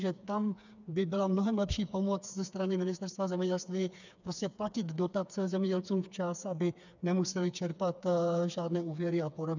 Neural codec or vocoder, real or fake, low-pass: codec, 16 kHz, 4 kbps, FreqCodec, smaller model; fake; 7.2 kHz